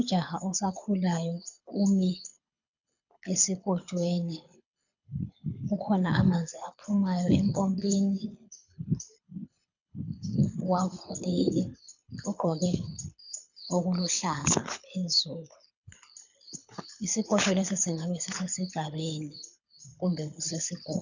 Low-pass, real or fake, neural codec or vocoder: 7.2 kHz; fake; codec, 24 kHz, 6 kbps, HILCodec